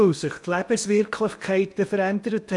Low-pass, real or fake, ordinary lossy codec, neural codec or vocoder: 10.8 kHz; fake; MP3, 96 kbps; codec, 16 kHz in and 24 kHz out, 0.8 kbps, FocalCodec, streaming, 65536 codes